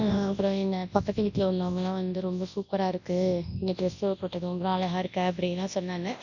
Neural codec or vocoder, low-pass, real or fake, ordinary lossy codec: codec, 24 kHz, 0.9 kbps, WavTokenizer, large speech release; 7.2 kHz; fake; none